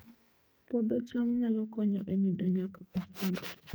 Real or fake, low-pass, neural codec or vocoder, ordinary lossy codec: fake; none; codec, 44.1 kHz, 2.6 kbps, SNAC; none